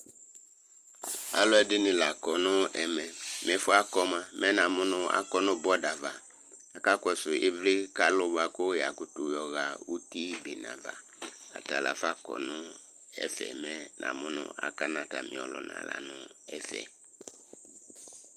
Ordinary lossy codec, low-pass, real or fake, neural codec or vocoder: Opus, 32 kbps; 14.4 kHz; fake; vocoder, 44.1 kHz, 128 mel bands every 256 samples, BigVGAN v2